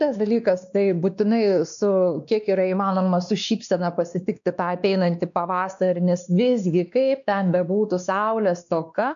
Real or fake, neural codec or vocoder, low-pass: fake; codec, 16 kHz, 2 kbps, X-Codec, WavLM features, trained on Multilingual LibriSpeech; 7.2 kHz